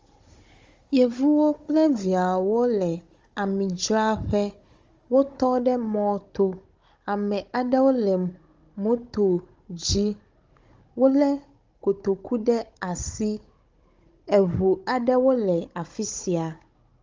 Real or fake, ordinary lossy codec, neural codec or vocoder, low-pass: fake; Opus, 32 kbps; codec, 16 kHz, 16 kbps, FunCodec, trained on Chinese and English, 50 frames a second; 7.2 kHz